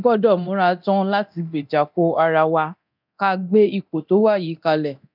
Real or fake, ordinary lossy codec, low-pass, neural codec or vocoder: fake; none; 5.4 kHz; codec, 24 kHz, 0.9 kbps, DualCodec